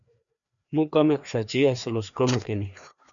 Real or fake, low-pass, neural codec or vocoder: fake; 7.2 kHz; codec, 16 kHz, 2 kbps, FreqCodec, larger model